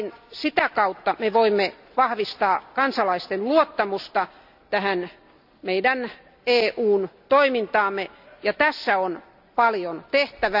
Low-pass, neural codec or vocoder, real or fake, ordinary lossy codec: 5.4 kHz; none; real; AAC, 48 kbps